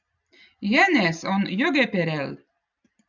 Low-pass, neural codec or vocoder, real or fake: 7.2 kHz; none; real